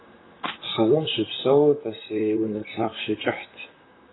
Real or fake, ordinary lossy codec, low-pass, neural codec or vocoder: fake; AAC, 16 kbps; 7.2 kHz; vocoder, 44.1 kHz, 128 mel bands, Pupu-Vocoder